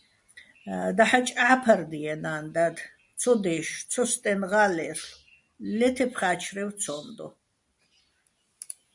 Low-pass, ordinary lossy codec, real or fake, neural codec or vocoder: 10.8 kHz; MP3, 64 kbps; real; none